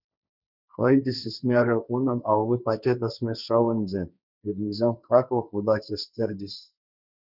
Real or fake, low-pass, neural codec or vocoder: fake; 5.4 kHz; codec, 16 kHz, 1.1 kbps, Voila-Tokenizer